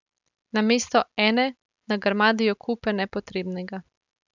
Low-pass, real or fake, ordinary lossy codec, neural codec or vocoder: 7.2 kHz; real; none; none